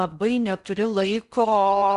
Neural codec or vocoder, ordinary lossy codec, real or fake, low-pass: codec, 16 kHz in and 24 kHz out, 0.6 kbps, FocalCodec, streaming, 4096 codes; Opus, 64 kbps; fake; 10.8 kHz